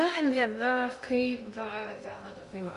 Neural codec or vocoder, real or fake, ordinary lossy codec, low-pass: codec, 16 kHz in and 24 kHz out, 0.6 kbps, FocalCodec, streaming, 2048 codes; fake; AAC, 48 kbps; 10.8 kHz